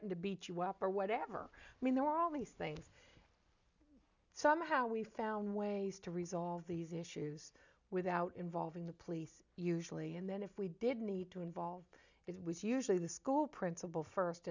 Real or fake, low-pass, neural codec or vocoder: real; 7.2 kHz; none